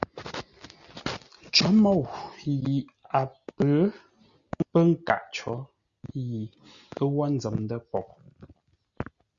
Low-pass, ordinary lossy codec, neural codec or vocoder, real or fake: 7.2 kHz; Opus, 64 kbps; none; real